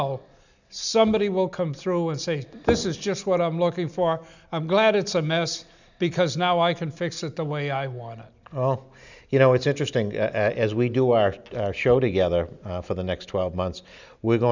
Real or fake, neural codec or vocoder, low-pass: real; none; 7.2 kHz